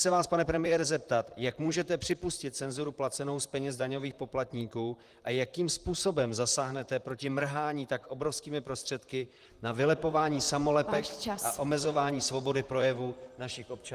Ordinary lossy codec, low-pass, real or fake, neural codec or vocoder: Opus, 24 kbps; 14.4 kHz; fake; vocoder, 44.1 kHz, 128 mel bands, Pupu-Vocoder